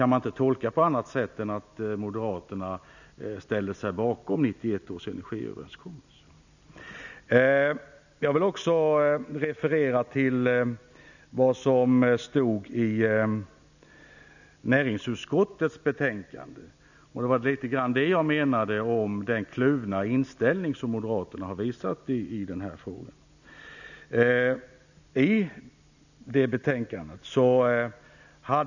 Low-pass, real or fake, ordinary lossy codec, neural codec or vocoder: 7.2 kHz; real; none; none